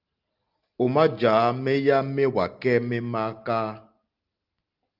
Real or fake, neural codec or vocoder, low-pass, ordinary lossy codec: real; none; 5.4 kHz; Opus, 32 kbps